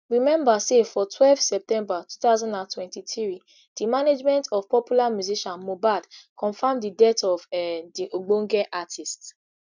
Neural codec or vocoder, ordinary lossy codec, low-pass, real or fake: none; none; 7.2 kHz; real